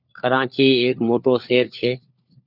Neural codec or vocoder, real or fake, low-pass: codec, 16 kHz, 4 kbps, FunCodec, trained on LibriTTS, 50 frames a second; fake; 5.4 kHz